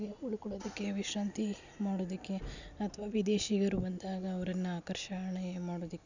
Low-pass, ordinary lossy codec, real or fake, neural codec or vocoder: 7.2 kHz; none; real; none